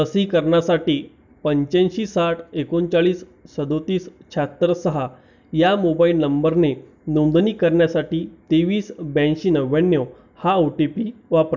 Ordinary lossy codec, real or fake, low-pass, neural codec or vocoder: none; real; 7.2 kHz; none